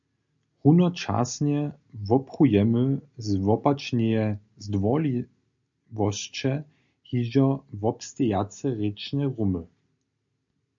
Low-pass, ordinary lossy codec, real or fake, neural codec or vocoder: 7.2 kHz; AAC, 64 kbps; real; none